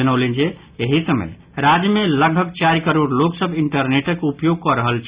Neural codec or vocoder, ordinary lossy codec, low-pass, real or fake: none; Opus, 64 kbps; 3.6 kHz; real